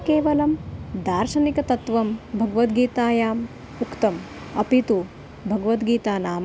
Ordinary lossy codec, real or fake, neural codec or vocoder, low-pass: none; real; none; none